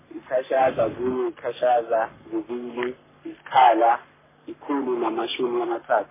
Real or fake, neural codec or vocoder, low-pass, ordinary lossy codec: fake; codec, 44.1 kHz, 3.4 kbps, Pupu-Codec; 3.6 kHz; MP3, 16 kbps